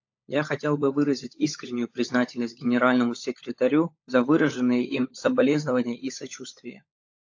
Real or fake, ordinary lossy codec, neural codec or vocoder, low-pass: fake; AAC, 48 kbps; codec, 16 kHz, 16 kbps, FunCodec, trained on LibriTTS, 50 frames a second; 7.2 kHz